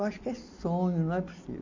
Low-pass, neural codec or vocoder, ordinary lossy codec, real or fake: 7.2 kHz; none; AAC, 48 kbps; real